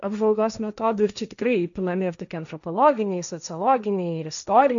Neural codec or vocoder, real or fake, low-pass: codec, 16 kHz, 1.1 kbps, Voila-Tokenizer; fake; 7.2 kHz